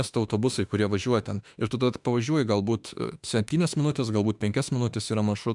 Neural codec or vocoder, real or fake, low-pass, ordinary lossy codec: autoencoder, 48 kHz, 32 numbers a frame, DAC-VAE, trained on Japanese speech; fake; 10.8 kHz; MP3, 96 kbps